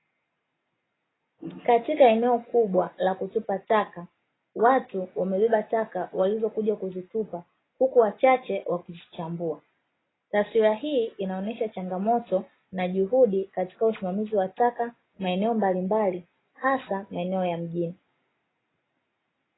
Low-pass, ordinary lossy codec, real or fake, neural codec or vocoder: 7.2 kHz; AAC, 16 kbps; real; none